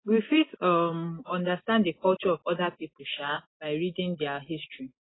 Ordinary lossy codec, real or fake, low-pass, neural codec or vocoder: AAC, 16 kbps; real; 7.2 kHz; none